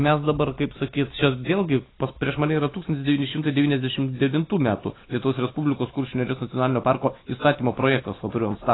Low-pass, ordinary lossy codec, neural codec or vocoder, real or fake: 7.2 kHz; AAC, 16 kbps; none; real